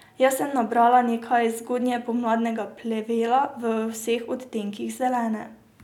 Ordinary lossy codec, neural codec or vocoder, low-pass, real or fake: none; none; 19.8 kHz; real